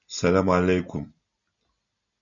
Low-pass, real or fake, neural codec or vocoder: 7.2 kHz; real; none